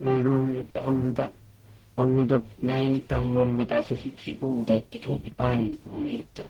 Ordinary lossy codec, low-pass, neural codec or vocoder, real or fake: Opus, 16 kbps; 19.8 kHz; codec, 44.1 kHz, 0.9 kbps, DAC; fake